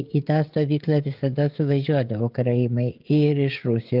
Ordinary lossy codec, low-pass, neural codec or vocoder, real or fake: Opus, 32 kbps; 5.4 kHz; codec, 16 kHz, 16 kbps, FreqCodec, smaller model; fake